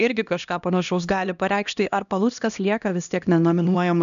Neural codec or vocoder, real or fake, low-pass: codec, 16 kHz, 1 kbps, X-Codec, HuBERT features, trained on LibriSpeech; fake; 7.2 kHz